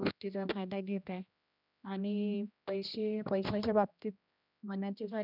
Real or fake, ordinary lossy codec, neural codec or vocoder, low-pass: fake; none; codec, 16 kHz, 1 kbps, X-Codec, HuBERT features, trained on general audio; 5.4 kHz